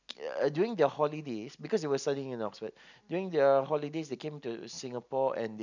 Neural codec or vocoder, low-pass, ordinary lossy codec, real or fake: none; 7.2 kHz; none; real